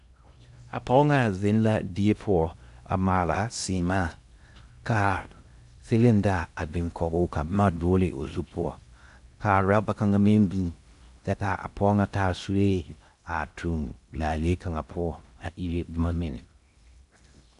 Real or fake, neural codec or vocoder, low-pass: fake; codec, 16 kHz in and 24 kHz out, 0.6 kbps, FocalCodec, streaming, 2048 codes; 10.8 kHz